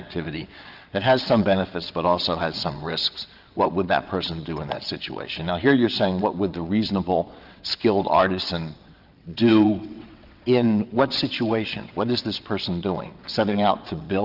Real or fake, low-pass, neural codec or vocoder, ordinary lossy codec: fake; 5.4 kHz; vocoder, 22.05 kHz, 80 mel bands, WaveNeXt; Opus, 24 kbps